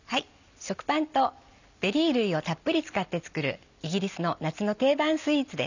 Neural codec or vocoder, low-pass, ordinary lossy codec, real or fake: none; 7.2 kHz; none; real